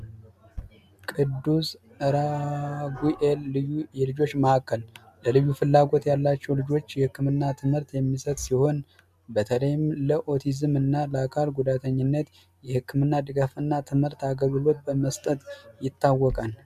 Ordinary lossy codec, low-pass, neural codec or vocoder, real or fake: MP3, 96 kbps; 14.4 kHz; none; real